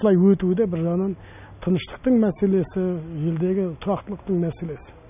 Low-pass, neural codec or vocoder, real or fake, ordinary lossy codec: 3.6 kHz; none; real; none